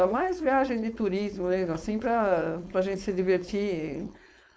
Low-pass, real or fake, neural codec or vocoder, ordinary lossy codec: none; fake; codec, 16 kHz, 4.8 kbps, FACodec; none